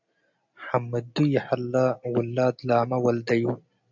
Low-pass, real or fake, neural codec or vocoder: 7.2 kHz; real; none